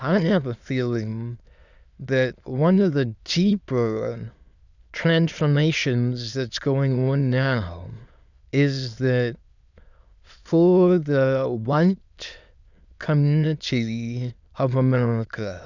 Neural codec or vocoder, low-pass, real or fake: autoencoder, 22.05 kHz, a latent of 192 numbers a frame, VITS, trained on many speakers; 7.2 kHz; fake